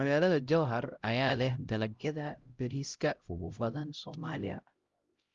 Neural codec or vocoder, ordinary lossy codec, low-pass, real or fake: codec, 16 kHz, 0.5 kbps, X-Codec, HuBERT features, trained on LibriSpeech; Opus, 32 kbps; 7.2 kHz; fake